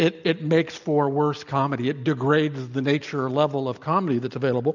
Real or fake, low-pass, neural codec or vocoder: real; 7.2 kHz; none